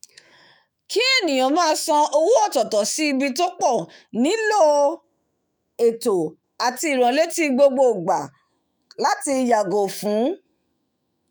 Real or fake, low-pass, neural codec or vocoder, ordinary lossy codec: fake; none; autoencoder, 48 kHz, 128 numbers a frame, DAC-VAE, trained on Japanese speech; none